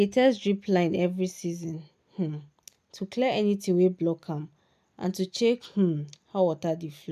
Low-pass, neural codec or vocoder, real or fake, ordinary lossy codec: 14.4 kHz; none; real; none